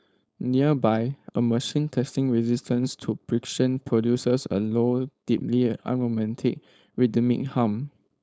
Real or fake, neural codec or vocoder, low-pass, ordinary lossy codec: fake; codec, 16 kHz, 4.8 kbps, FACodec; none; none